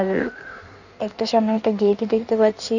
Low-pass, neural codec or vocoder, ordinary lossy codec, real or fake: 7.2 kHz; codec, 16 kHz in and 24 kHz out, 1.1 kbps, FireRedTTS-2 codec; none; fake